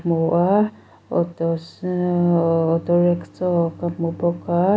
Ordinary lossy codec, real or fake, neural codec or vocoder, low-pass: none; real; none; none